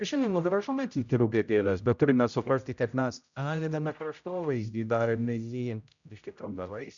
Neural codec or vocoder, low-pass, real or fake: codec, 16 kHz, 0.5 kbps, X-Codec, HuBERT features, trained on general audio; 7.2 kHz; fake